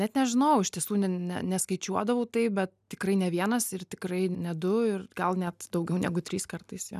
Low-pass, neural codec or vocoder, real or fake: 14.4 kHz; none; real